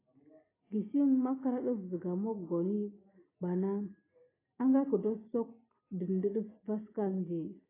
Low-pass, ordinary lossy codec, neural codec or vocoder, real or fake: 3.6 kHz; AAC, 16 kbps; none; real